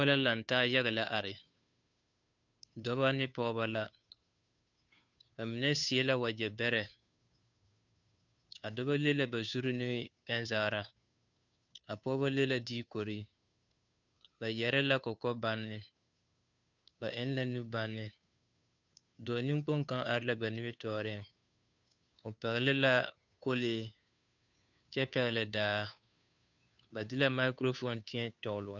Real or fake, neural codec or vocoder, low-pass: fake; codec, 16 kHz, 2 kbps, FunCodec, trained on Chinese and English, 25 frames a second; 7.2 kHz